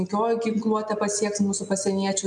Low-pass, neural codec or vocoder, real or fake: 10.8 kHz; none; real